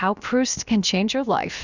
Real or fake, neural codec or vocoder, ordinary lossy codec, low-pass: fake; codec, 16 kHz, about 1 kbps, DyCAST, with the encoder's durations; Opus, 64 kbps; 7.2 kHz